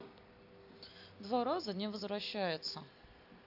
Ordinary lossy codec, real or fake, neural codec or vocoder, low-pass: AAC, 48 kbps; real; none; 5.4 kHz